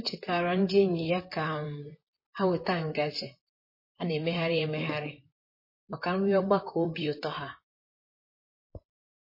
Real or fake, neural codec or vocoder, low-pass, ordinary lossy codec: fake; vocoder, 44.1 kHz, 128 mel bands, Pupu-Vocoder; 5.4 kHz; MP3, 24 kbps